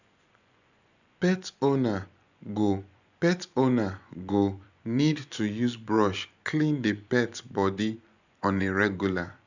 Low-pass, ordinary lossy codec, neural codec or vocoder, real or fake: 7.2 kHz; none; vocoder, 24 kHz, 100 mel bands, Vocos; fake